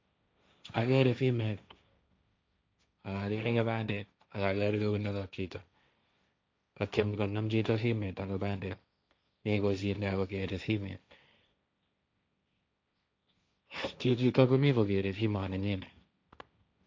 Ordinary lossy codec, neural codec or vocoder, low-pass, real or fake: none; codec, 16 kHz, 1.1 kbps, Voila-Tokenizer; none; fake